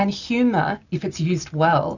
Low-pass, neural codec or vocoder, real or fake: 7.2 kHz; none; real